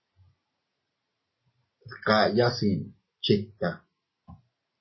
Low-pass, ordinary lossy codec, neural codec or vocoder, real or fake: 7.2 kHz; MP3, 24 kbps; none; real